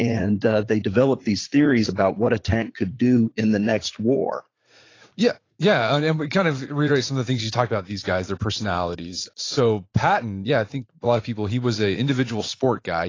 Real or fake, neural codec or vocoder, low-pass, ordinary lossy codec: fake; vocoder, 44.1 kHz, 80 mel bands, Vocos; 7.2 kHz; AAC, 32 kbps